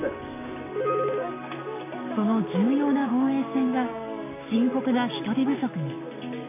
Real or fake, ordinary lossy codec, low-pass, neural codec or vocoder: fake; MP3, 24 kbps; 3.6 kHz; codec, 16 kHz, 6 kbps, DAC